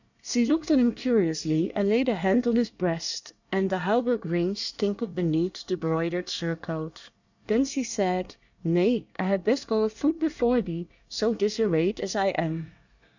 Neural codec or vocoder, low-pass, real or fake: codec, 24 kHz, 1 kbps, SNAC; 7.2 kHz; fake